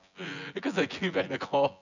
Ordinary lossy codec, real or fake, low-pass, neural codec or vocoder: none; fake; 7.2 kHz; vocoder, 24 kHz, 100 mel bands, Vocos